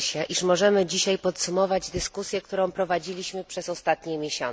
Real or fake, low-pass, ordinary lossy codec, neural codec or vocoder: real; none; none; none